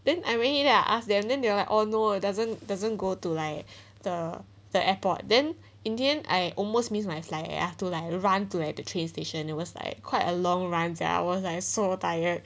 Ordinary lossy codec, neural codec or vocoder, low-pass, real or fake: none; none; none; real